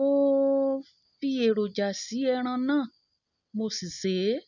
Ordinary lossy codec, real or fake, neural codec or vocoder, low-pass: none; real; none; 7.2 kHz